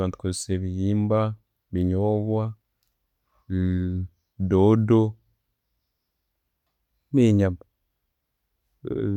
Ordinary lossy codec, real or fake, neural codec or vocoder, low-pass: none; real; none; 19.8 kHz